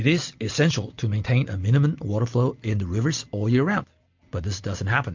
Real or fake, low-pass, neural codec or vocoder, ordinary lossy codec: real; 7.2 kHz; none; MP3, 48 kbps